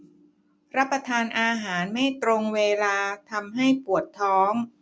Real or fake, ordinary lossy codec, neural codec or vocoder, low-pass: real; none; none; none